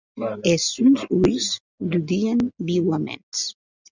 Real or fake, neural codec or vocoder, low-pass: real; none; 7.2 kHz